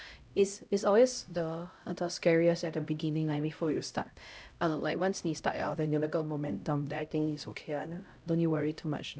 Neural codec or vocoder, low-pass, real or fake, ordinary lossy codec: codec, 16 kHz, 0.5 kbps, X-Codec, HuBERT features, trained on LibriSpeech; none; fake; none